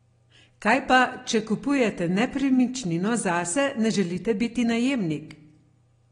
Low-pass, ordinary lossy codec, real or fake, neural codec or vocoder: 9.9 kHz; AAC, 32 kbps; real; none